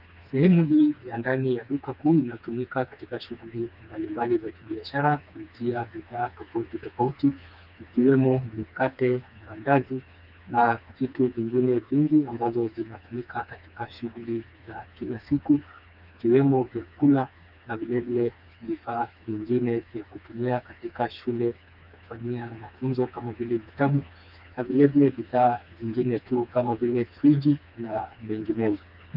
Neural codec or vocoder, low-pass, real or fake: codec, 16 kHz, 2 kbps, FreqCodec, smaller model; 5.4 kHz; fake